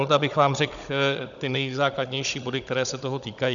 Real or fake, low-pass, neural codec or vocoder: fake; 7.2 kHz; codec, 16 kHz, 16 kbps, FunCodec, trained on Chinese and English, 50 frames a second